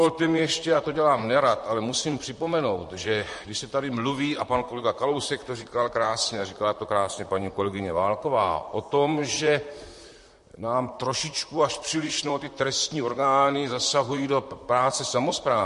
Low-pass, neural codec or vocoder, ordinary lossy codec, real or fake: 14.4 kHz; vocoder, 44.1 kHz, 128 mel bands, Pupu-Vocoder; MP3, 48 kbps; fake